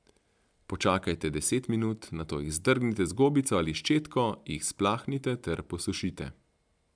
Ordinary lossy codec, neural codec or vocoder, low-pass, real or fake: MP3, 96 kbps; none; 9.9 kHz; real